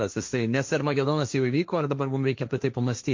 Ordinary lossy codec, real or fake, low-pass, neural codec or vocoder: MP3, 48 kbps; fake; 7.2 kHz; codec, 16 kHz, 1.1 kbps, Voila-Tokenizer